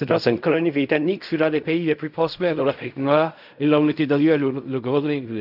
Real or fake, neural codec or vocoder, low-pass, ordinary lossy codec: fake; codec, 16 kHz in and 24 kHz out, 0.4 kbps, LongCat-Audio-Codec, fine tuned four codebook decoder; 5.4 kHz; none